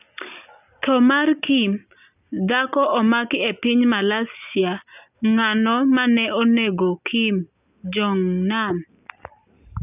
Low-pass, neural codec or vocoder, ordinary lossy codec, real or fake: 3.6 kHz; none; none; real